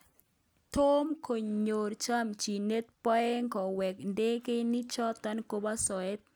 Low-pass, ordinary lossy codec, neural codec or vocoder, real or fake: none; none; none; real